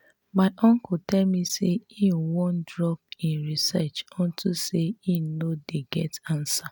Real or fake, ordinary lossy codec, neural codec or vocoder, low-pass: real; none; none; none